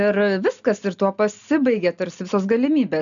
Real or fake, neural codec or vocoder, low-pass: real; none; 7.2 kHz